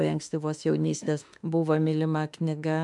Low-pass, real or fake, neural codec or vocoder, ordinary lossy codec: 10.8 kHz; fake; autoencoder, 48 kHz, 32 numbers a frame, DAC-VAE, trained on Japanese speech; MP3, 96 kbps